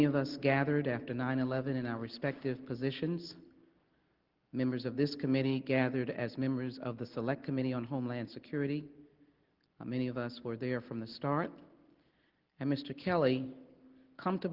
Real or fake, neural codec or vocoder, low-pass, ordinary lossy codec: real; none; 5.4 kHz; Opus, 16 kbps